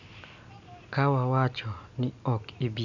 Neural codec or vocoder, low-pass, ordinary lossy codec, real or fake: none; 7.2 kHz; none; real